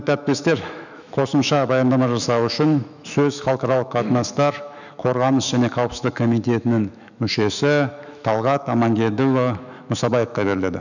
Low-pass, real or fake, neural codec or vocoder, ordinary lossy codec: 7.2 kHz; fake; autoencoder, 48 kHz, 128 numbers a frame, DAC-VAE, trained on Japanese speech; none